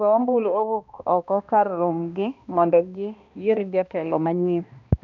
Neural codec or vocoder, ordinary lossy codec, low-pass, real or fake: codec, 16 kHz, 1 kbps, X-Codec, HuBERT features, trained on balanced general audio; none; 7.2 kHz; fake